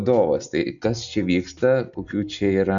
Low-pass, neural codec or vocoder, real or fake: 7.2 kHz; none; real